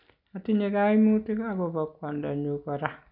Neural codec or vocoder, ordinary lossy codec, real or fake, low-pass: none; none; real; 5.4 kHz